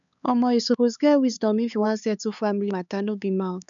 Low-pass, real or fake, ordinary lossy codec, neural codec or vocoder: 7.2 kHz; fake; none; codec, 16 kHz, 4 kbps, X-Codec, HuBERT features, trained on LibriSpeech